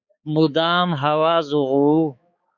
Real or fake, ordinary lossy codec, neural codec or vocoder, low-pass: fake; Opus, 64 kbps; codec, 16 kHz, 4 kbps, X-Codec, HuBERT features, trained on balanced general audio; 7.2 kHz